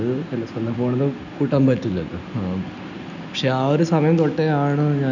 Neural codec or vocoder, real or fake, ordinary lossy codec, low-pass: none; real; none; 7.2 kHz